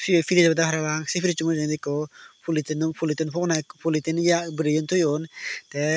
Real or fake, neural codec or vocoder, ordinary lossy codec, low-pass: real; none; none; none